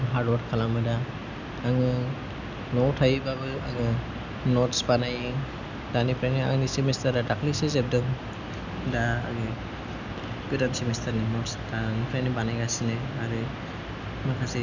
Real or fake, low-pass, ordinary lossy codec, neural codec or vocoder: real; 7.2 kHz; none; none